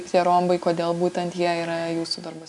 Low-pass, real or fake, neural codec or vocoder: 10.8 kHz; real; none